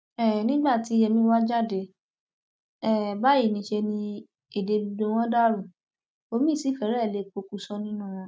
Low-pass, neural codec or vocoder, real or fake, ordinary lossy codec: none; none; real; none